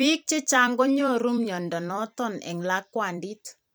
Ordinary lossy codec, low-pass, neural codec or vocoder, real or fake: none; none; vocoder, 44.1 kHz, 128 mel bands every 256 samples, BigVGAN v2; fake